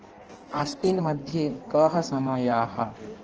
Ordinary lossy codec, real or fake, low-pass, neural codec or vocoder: Opus, 16 kbps; fake; 7.2 kHz; codec, 16 kHz in and 24 kHz out, 1.1 kbps, FireRedTTS-2 codec